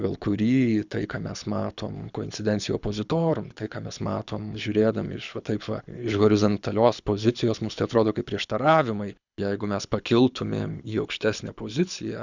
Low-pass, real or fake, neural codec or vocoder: 7.2 kHz; fake; codec, 24 kHz, 6 kbps, HILCodec